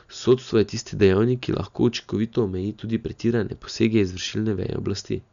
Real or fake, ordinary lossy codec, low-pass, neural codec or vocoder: real; none; 7.2 kHz; none